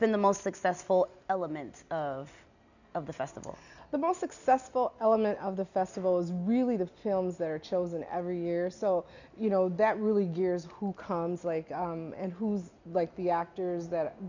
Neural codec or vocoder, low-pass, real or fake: none; 7.2 kHz; real